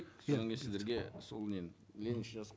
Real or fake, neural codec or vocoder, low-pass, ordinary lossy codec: real; none; none; none